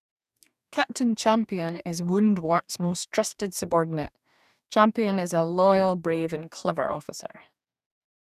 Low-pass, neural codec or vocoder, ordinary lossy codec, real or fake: 14.4 kHz; codec, 44.1 kHz, 2.6 kbps, DAC; none; fake